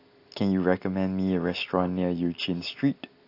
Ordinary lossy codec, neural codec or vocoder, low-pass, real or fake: AAC, 32 kbps; none; 5.4 kHz; real